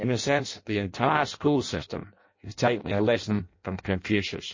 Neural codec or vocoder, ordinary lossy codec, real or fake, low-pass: codec, 16 kHz in and 24 kHz out, 0.6 kbps, FireRedTTS-2 codec; MP3, 32 kbps; fake; 7.2 kHz